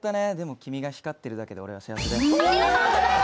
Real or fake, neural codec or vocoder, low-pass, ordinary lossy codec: real; none; none; none